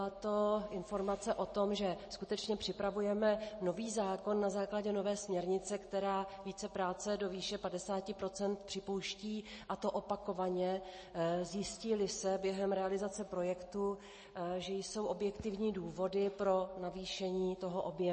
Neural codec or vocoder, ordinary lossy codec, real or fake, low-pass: none; MP3, 32 kbps; real; 10.8 kHz